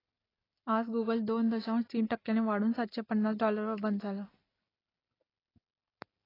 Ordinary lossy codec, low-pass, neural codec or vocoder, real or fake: AAC, 24 kbps; 5.4 kHz; none; real